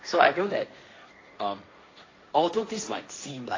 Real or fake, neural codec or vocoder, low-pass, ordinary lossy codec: fake; codec, 16 kHz, 1.1 kbps, Voila-Tokenizer; 7.2 kHz; AAC, 32 kbps